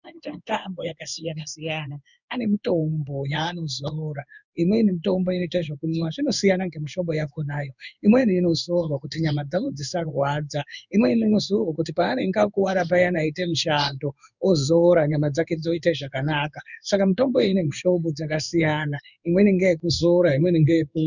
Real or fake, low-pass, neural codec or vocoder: fake; 7.2 kHz; codec, 16 kHz in and 24 kHz out, 1 kbps, XY-Tokenizer